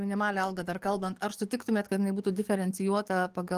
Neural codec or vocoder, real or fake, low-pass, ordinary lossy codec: codec, 44.1 kHz, 7.8 kbps, DAC; fake; 14.4 kHz; Opus, 24 kbps